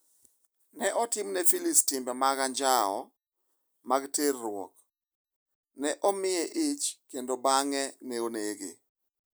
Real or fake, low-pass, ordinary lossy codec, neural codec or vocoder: real; none; none; none